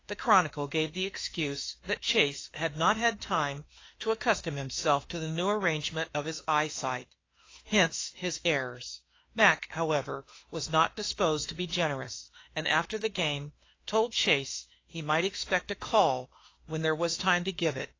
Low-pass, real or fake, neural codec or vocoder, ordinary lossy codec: 7.2 kHz; fake; autoencoder, 48 kHz, 32 numbers a frame, DAC-VAE, trained on Japanese speech; AAC, 32 kbps